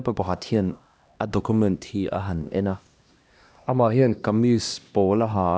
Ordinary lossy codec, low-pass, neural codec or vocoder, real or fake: none; none; codec, 16 kHz, 1 kbps, X-Codec, HuBERT features, trained on LibriSpeech; fake